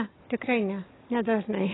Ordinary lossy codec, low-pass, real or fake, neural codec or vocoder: AAC, 16 kbps; 7.2 kHz; real; none